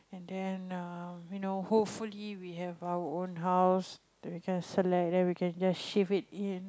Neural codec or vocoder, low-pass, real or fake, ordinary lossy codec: none; none; real; none